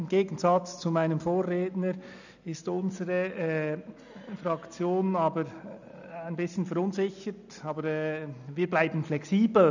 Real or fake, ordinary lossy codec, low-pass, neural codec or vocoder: real; none; 7.2 kHz; none